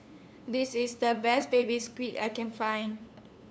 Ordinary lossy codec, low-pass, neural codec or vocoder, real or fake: none; none; codec, 16 kHz, 2 kbps, FunCodec, trained on LibriTTS, 25 frames a second; fake